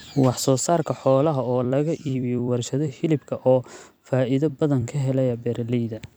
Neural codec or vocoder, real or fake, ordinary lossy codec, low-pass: vocoder, 44.1 kHz, 128 mel bands every 256 samples, BigVGAN v2; fake; none; none